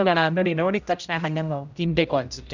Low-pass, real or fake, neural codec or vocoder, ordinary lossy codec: 7.2 kHz; fake; codec, 16 kHz, 0.5 kbps, X-Codec, HuBERT features, trained on general audio; none